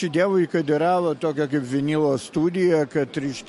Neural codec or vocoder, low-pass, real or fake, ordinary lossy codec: none; 14.4 kHz; real; MP3, 48 kbps